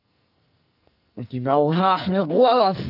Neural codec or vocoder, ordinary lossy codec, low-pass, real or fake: codec, 44.1 kHz, 2.6 kbps, SNAC; none; 5.4 kHz; fake